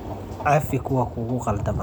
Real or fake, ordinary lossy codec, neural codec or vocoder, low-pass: real; none; none; none